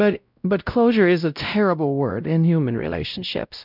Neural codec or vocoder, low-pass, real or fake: codec, 16 kHz, 0.5 kbps, X-Codec, WavLM features, trained on Multilingual LibriSpeech; 5.4 kHz; fake